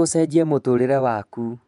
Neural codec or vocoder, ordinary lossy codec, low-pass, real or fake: vocoder, 24 kHz, 100 mel bands, Vocos; MP3, 96 kbps; 10.8 kHz; fake